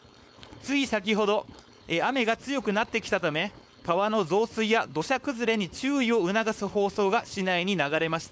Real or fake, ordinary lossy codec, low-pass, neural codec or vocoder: fake; none; none; codec, 16 kHz, 4.8 kbps, FACodec